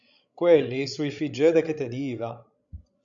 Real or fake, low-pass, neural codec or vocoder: fake; 7.2 kHz; codec, 16 kHz, 16 kbps, FreqCodec, larger model